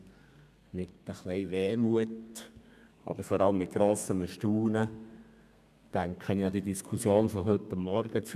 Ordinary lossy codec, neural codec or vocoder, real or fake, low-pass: none; codec, 32 kHz, 1.9 kbps, SNAC; fake; 14.4 kHz